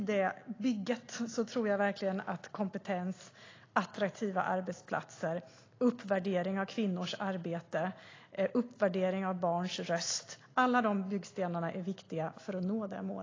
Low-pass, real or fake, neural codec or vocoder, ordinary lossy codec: 7.2 kHz; real; none; AAC, 32 kbps